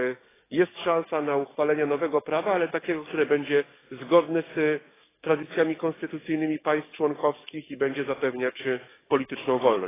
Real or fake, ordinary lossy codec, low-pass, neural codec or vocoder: fake; AAC, 16 kbps; 3.6 kHz; codec, 44.1 kHz, 7.8 kbps, DAC